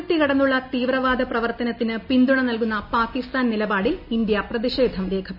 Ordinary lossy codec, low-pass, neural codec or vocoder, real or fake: none; 5.4 kHz; none; real